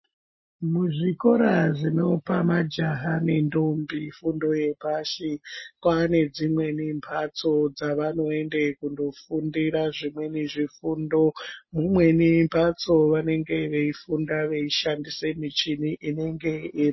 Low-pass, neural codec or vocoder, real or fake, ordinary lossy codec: 7.2 kHz; none; real; MP3, 24 kbps